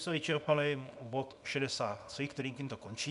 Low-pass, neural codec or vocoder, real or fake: 10.8 kHz; codec, 24 kHz, 0.9 kbps, WavTokenizer, small release; fake